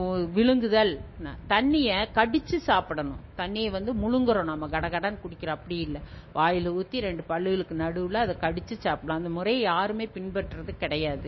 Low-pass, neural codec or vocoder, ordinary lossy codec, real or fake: 7.2 kHz; autoencoder, 48 kHz, 128 numbers a frame, DAC-VAE, trained on Japanese speech; MP3, 24 kbps; fake